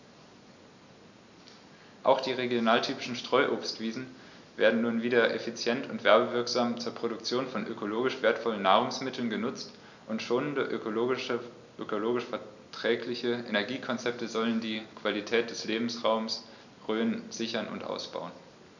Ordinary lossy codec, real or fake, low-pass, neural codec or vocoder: none; real; 7.2 kHz; none